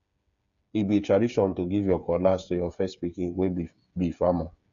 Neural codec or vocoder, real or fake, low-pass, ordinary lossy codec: codec, 16 kHz, 8 kbps, FreqCodec, smaller model; fake; 7.2 kHz; none